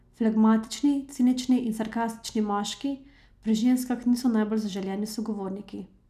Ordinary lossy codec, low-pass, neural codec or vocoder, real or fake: none; 14.4 kHz; none; real